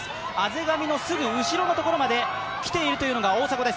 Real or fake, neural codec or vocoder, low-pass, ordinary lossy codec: real; none; none; none